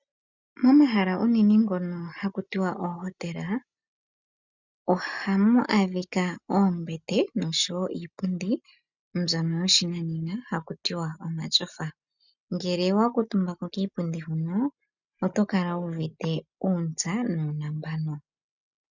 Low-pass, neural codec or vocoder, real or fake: 7.2 kHz; none; real